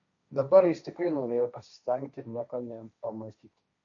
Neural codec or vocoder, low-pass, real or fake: codec, 16 kHz, 1.1 kbps, Voila-Tokenizer; 7.2 kHz; fake